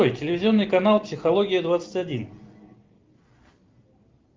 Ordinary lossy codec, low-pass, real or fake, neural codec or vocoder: Opus, 32 kbps; 7.2 kHz; real; none